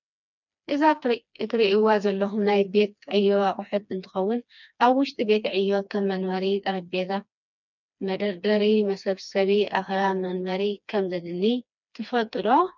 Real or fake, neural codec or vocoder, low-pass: fake; codec, 16 kHz, 2 kbps, FreqCodec, smaller model; 7.2 kHz